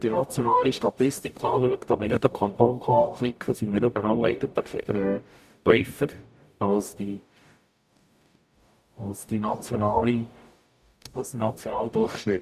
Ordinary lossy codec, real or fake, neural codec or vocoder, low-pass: none; fake; codec, 44.1 kHz, 0.9 kbps, DAC; 14.4 kHz